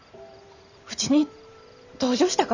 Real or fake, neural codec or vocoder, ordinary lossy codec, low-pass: fake; vocoder, 44.1 kHz, 128 mel bands every 512 samples, BigVGAN v2; none; 7.2 kHz